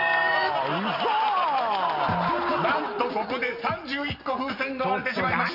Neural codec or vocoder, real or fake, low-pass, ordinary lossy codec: none; real; 5.4 kHz; none